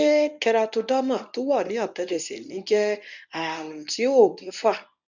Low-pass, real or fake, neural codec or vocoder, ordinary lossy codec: 7.2 kHz; fake; codec, 24 kHz, 0.9 kbps, WavTokenizer, medium speech release version 1; none